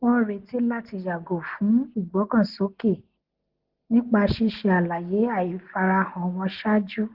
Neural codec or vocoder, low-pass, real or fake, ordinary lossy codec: none; 5.4 kHz; real; Opus, 16 kbps